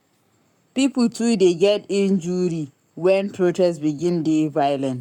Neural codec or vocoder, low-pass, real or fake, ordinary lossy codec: codec, 44.1 kHz, 7.8 kbps, Pupu-Codec; 19.8 kHz; fake; none